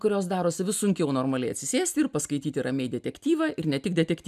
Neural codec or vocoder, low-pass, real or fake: none; 14.4 kHz; real